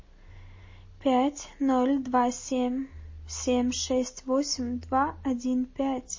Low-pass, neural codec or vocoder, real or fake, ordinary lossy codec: 7.2 kHz; none; real; MP3, 32 kbps